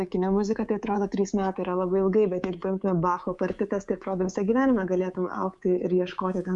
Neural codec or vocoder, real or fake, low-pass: codec, 24 kHz, 3.1 kbps, DualCodec; fake; 10.8 kHz